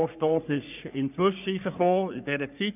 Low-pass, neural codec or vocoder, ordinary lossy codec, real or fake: 3.6 kHz; codec, 44.1 kHz, 3.4 kbps, Pupu-Codec; none; fake